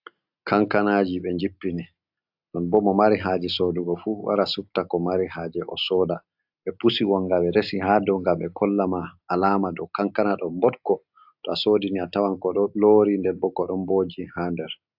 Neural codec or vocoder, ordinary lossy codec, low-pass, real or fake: none; AAC, 48 kbps; 5.4 kHz; real